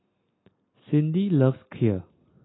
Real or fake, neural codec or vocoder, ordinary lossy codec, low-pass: real; none; AAC, 16 kbps; 7.2 kHz